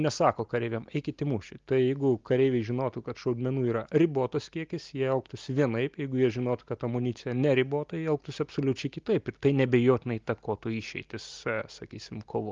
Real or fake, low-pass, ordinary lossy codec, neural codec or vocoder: real; 7.2 kHz; Opus, 32 kbps; none